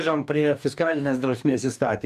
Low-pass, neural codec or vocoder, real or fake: 14.4 kHz; codec, 44.1 kHz, 2.6 kbps, DAC; fake